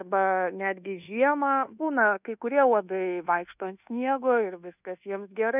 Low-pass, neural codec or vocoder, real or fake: 3.6 kHz; codec, 24 kHz, 1.2 kbps, DualCodec; fake